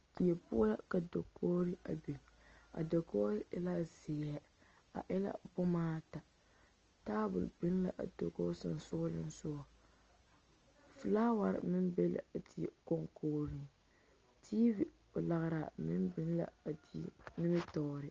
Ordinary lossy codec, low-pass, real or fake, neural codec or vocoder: Opus, 24 kbps; 7.2 kHz; real; none